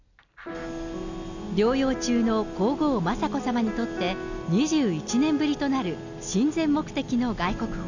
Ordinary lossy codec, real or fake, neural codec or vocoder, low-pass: none; real; none; 7.2 kHz